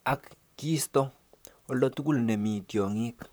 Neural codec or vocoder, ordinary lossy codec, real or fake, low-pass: vocoder, 44.1 kHz, 128 mel bands, Pupu-Vocoder; none; fake; none